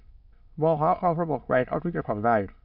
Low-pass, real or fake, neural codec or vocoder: 5.4 kHz; fake; autoencoder, 22.05 kHz, a latent of 192 numbers a frame, VITS, trained on many speakers